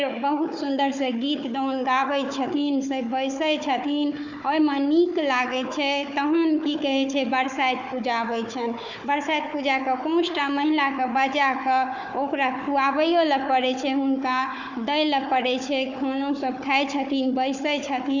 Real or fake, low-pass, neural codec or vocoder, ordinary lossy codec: fake; 7.2 kHz; codec, 16 kHz, 4 kbps, FunCodec, trained on Chinese and English, 50 frames a second; none